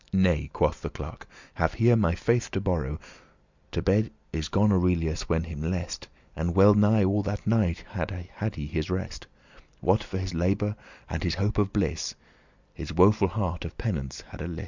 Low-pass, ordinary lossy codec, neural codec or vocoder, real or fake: 7.2 kHz; Opus, 64 kbps; none; real